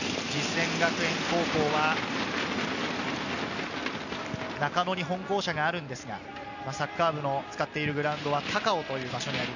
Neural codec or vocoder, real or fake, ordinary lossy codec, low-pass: none; real; none; 7.2 kHz